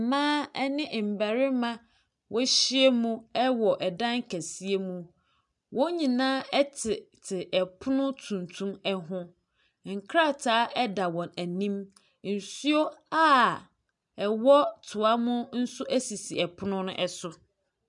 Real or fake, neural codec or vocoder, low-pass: real; none; 10.8 kHz